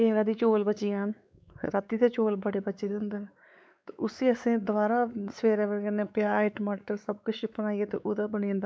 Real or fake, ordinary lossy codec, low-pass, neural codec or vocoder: fake; none; none; codec, 16 kHz, 4 kbps, X-Codec, WavLM features, trained on Multilingual LibriSpeech